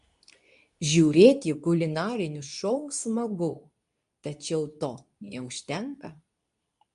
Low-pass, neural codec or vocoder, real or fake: 10.8 kHz; codec, 24 kHz, 0.9 kbps, WavTokenizer, medium speech release version 2; fake